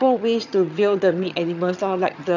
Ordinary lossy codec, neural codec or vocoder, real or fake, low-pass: none; vocoder, 22.05 kHz, 80 mel bands, HiFi-GAN; fake; 7.2 kHz